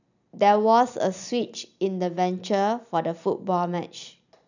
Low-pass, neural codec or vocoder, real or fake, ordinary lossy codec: 7.2 kHz; vocoder, 44.1 kHz, 128 mel bands every 256 samples, BigVGAN v2; fake; none